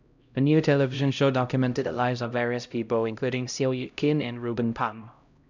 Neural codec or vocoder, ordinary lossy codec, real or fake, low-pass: codec, 16 kHz, 0.5 kbps, X-Codec, HuBERT features, trained on LibriSpeech; none; fake; 7.2 kHz